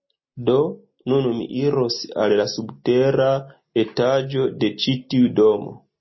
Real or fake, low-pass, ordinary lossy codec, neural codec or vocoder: real; 7.2 kHz; MP3, 24 kbps; none